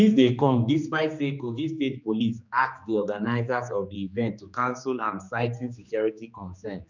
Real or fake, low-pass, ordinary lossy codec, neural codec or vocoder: fake; 7.2 kHz; none; codec, 16 kHz, 2 kbps, X-Codec, HuBERT features, trained on general audio